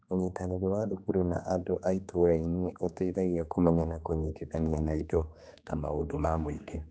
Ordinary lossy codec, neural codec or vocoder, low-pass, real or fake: none; codec, 16 kHz, 2 kbps, X-Codec, HuBERT features, trained on general audio; none; fake